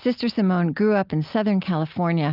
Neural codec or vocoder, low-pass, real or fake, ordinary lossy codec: none; 5.4 kHz; real; Opus, 32 kbps